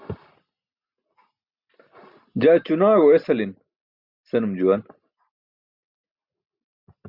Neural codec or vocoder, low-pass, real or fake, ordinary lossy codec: none; 5.4 kHz; real; Opus, 64 kbps